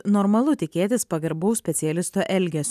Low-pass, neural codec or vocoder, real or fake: 14.4 kHz; none; real